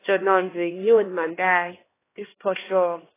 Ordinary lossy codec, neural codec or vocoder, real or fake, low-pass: AAC, 16 kbps; codec, 16 kHz, 1 kbps, X-Codec, HuBERT features, trained on LibriSpeech; fake; 3.6 kHz